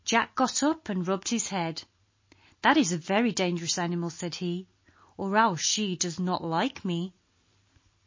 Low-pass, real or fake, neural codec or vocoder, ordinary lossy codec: 7.2 kHz; real; none; MP3, 32 kbps